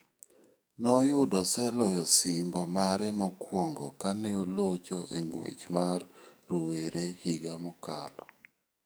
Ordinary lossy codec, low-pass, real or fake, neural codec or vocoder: none; none; fake; codec, 44.1 kHz, 2.6 kbps, SNAC